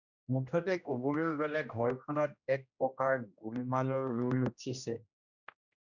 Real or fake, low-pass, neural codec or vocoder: fake; 7.2 kHz; codec, 16 kHz, 1 kbps, X-Codec, HuBERT features, trained on general audio